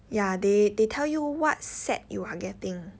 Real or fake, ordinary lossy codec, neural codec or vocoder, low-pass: real; none; none; none